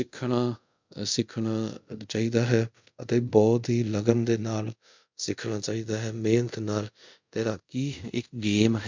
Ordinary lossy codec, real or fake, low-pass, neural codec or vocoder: MP3, 64 kbps; fake; 7.2 kHz; codec, 24 kHz, 0.5 kbps, DualCodec